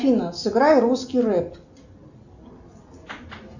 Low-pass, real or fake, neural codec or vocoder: 7.2 kHz; real; none